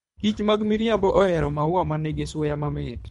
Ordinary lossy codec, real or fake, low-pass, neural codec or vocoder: MP3, 64 kbps; fake; 10.8 kHz; codec, 24 kHz, 3 kbps, HILCodec